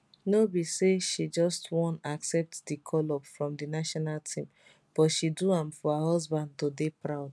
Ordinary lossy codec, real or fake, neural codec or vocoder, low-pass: none; real; none; none